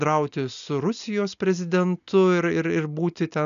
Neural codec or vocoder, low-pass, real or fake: none; 7.2 kHz; real